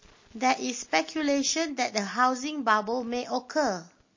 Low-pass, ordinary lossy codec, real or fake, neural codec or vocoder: 7.2 kHz; MP3, 32 kbps; real; none